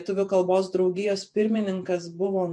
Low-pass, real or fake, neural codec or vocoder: 10.8 kHz; fake; vocoder, 48 kHz, 128 mel bands, Vocos